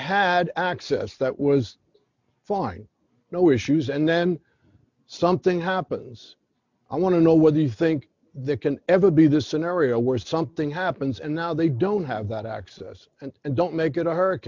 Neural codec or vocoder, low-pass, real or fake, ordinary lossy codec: none; 7.2 kHz; real; MP3, 64 kbps